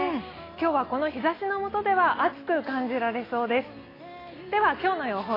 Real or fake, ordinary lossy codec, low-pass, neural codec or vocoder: real; AAC, 24 kbps; 5.4 kHz; none